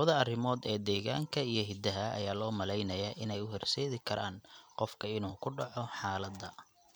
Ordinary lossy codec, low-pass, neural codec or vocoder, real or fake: none; none; none; real